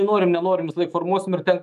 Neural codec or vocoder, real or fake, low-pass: autoencoder, 48 kHz, 128 numbers a frame, DAC-VAE, trained on Japanese speech; fake; 14.4 kHz